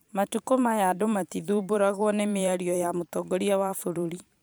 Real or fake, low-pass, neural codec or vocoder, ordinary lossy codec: fake; none; vocoder, 44.1 kHz, 128 mel bands every 512 samples, BigVGAN v2; none